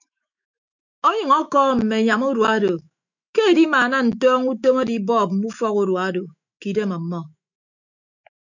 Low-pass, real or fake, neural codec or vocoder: 7.2 kHz; fake; autoencoder, 48 kHz, 128 numbers a frame, DAC-VAE, trained on Japanese speech